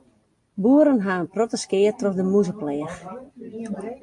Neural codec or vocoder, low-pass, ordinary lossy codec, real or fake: none; 10.8 kHz; MP3, 48 kbps; real